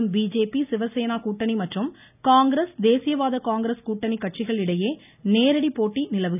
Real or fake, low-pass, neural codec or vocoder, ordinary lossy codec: real; 3.6 kHz; none; none